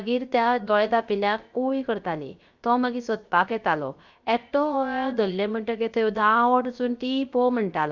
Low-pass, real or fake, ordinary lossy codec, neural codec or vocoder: 7.2 kHz; fake; Opus, 64 kbps; codec, 16 kHz, 0.7 kbps, FocalCodec